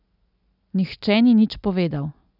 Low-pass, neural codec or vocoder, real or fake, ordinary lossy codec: 5.4 kHz; none; real; none